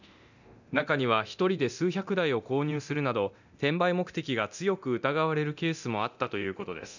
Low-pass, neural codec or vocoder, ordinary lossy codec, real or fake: 7.2 kHz; codec, 24 kHz, 0.9 kbps, DualCodec; none; fake